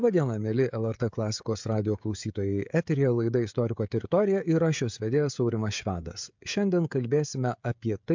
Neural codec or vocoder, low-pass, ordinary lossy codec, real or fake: codec, 16 kHz, 16 kbps, FreqCodec, smaller model; 7.2 kHz; MP3, 64 kbps; fake